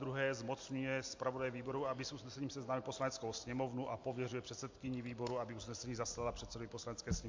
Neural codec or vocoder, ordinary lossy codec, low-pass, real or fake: none; MP3, 48 kbps; 7.2 kHz; real